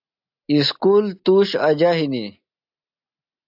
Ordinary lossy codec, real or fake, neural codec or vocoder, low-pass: AAC, 48 kbps; real; none; 5.4 kHz